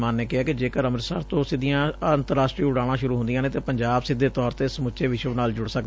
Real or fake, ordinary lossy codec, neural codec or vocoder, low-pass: real; none; none; none